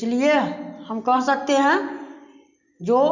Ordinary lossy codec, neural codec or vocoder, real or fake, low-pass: none; none; real; 7.2 kHz